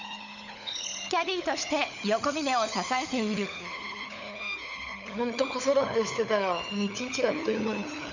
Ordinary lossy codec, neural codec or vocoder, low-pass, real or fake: none; codec, 16 kHz, 16 kbps, FunCodec, trained on LibriTTS, 50 frames a second; 7.2 kHz; fake